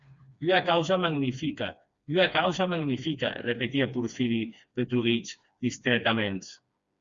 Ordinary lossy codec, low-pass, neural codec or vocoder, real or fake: Opus, 64 kbps; 7.2 kHz; codec, 16 kHz, 2 kbps, FreqCodec, smaller model; fake